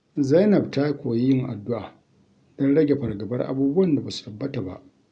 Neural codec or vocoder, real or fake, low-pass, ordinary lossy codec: none; real; 9.9 kHz; none